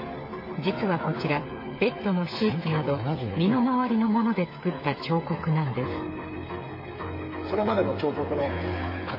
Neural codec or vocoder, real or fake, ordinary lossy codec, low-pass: codec, 16 kHz, 8 kbps, FreqCodec, smaller model; fake; MP3, 24 kbps; 5.4 kHz